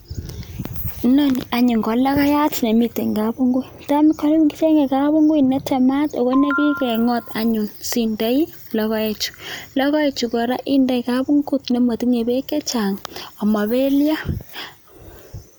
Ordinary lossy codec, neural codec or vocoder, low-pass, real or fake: none; none; none; real